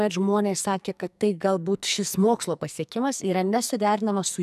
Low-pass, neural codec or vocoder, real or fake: 14.4 kHz; codec, 44.1 kHz, 2.6 kbps, SNAC; fake